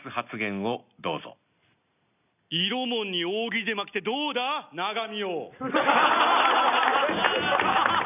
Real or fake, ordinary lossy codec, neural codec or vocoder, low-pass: real; none; none; 3.6 kHz